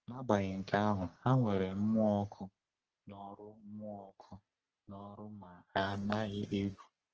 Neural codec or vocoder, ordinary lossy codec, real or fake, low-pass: codec, 44.1 kHz, 3.4 kbps, Pupu-Codec; Opus, 16 kbps; fake; 7.2 kHz